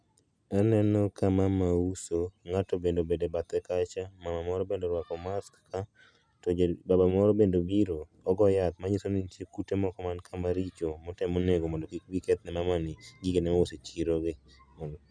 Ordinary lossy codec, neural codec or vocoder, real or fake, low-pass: none; none; real; none